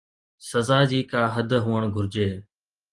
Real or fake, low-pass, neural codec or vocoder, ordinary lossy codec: real; 10.8 kHz; none; Opus, 24 kbps